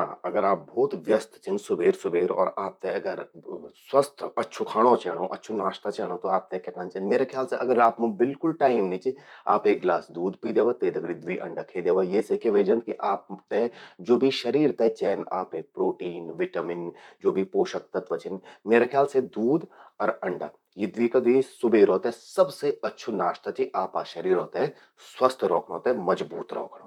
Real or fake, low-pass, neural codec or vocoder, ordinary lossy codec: fake; 19.8 kHz; vocoder, 44.1 kHz, 128 mel bands, Pupu-Vocoder; none